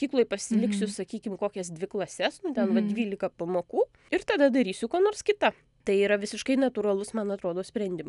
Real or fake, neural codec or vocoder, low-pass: real; none; 10.8 kHz